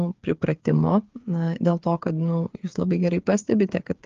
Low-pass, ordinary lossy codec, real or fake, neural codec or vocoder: 7.2 kHz; Opus, 32 kbps; fake; codec, 16 kHz, 8 kbps, FreqCodec, smaller model